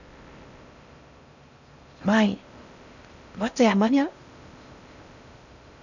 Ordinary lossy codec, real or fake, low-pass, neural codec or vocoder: none; fake; 7.2 kHz; codec, 16 kHz in and 24 kHz out, 0.6 kbps, FocalCodec, streaming, 4096 codes